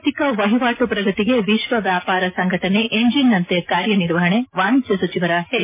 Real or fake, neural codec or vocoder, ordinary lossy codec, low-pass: real; none; MP3, 24 kbps; 3.6 kHz